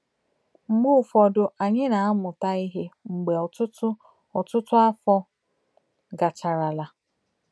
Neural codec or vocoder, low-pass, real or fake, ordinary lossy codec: none; none; real; none